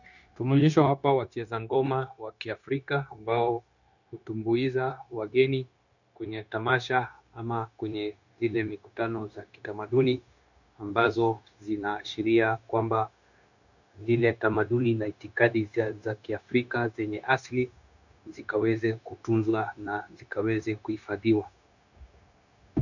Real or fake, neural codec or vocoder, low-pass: fake; codec, 16 kHz, 0.9 kbps, LongCat-Audio-Codec; 7.2 kHz